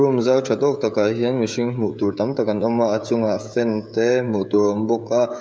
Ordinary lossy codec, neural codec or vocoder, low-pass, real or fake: none; codec, 16 kHz, 16 kbps, FreqCodec, smaller model; none; fake